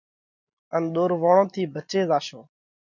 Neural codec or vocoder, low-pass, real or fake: none; 7.2 kHz; real